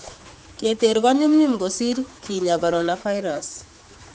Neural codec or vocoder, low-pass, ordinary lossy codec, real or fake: codec, 16 kHz, 4 kbps, X-Codec, HuBERT features, trained on general audio; none; none; fake